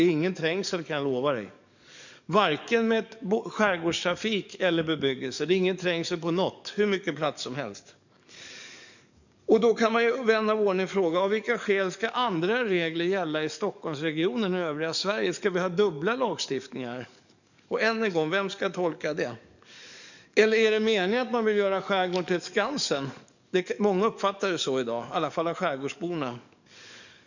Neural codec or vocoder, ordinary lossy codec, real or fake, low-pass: codec, 44.1 kHz, 7.8 kbps, DAC; none; fake; 7.2 kHz